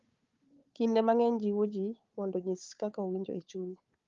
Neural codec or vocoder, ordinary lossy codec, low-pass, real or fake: codec, 16 kHz, 4 kbps, FunCodec, trained on Chinese and English, 50 frames a second; Opus, 32 kbps; 7.2 kHz; fake